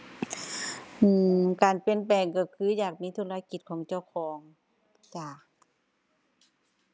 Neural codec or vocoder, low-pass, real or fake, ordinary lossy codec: none; none; real; none